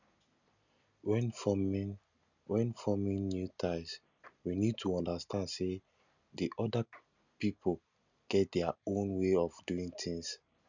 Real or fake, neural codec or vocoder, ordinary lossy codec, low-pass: real; none; none; 7.2 kHz